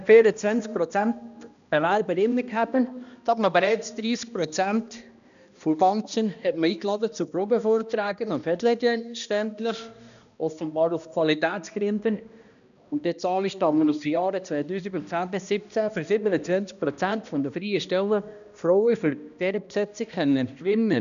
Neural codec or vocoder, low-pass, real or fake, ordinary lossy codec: codec, 16 kHz, 1 kbps, X-Codec, HuBERT features, trained on balanced general audio; 7.2 kHz; fake; none